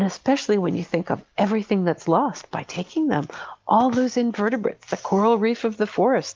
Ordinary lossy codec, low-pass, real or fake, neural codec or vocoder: Opus, 32 kbps; 7.2 kHz; real; none